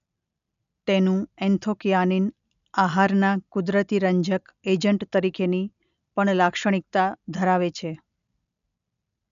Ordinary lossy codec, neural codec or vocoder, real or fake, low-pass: none; none; real; 7.2 kHz